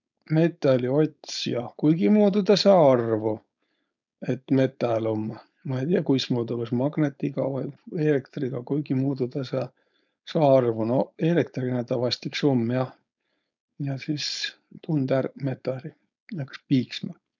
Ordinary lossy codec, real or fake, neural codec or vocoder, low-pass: none; fake; codec, 16 kHz, 4.8 kbps, FACodec; 7.2 kHz